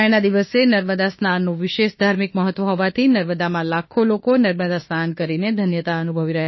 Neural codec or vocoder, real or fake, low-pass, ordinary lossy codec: autoencoder, 48 kHz, 32 numbers a frame, DAC-VAE, trained on Japanese speech; fake; 7.2 kHz; MP3, 24 kbps